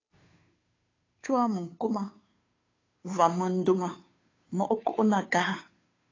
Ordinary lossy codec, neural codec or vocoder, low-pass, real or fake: AAC, 32 kbps; codec, 16 kHz, 2 kbps, FunCodec, trained on Chinese and English, 25 frames a second; 7.2 kHz; fake